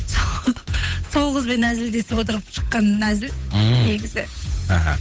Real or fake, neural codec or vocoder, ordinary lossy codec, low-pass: fake; codec, 16 kHz, 8 kbps, FunCodec, trained on Chinese and English, 25 frames a second; none; none